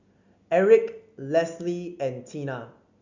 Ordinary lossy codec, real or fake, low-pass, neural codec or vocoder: Opus, 64 kbps; real; 7.2 kHz; none